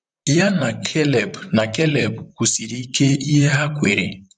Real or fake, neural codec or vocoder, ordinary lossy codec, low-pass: fake; vocoder, 44.1 kHz, 128 mel bands, Pupu-Vocoder; none; 9.9 kHz